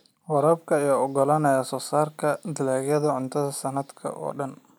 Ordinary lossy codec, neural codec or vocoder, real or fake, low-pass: none; none; real; none